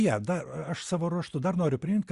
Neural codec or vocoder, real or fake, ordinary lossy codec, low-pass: none; real; Opus, 64 kbps; 10.8 kHz